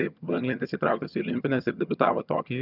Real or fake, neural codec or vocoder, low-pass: fake; vocoder, 22.05 kHz, 80 mel bands, HiFi-GAN; 5.4 kHz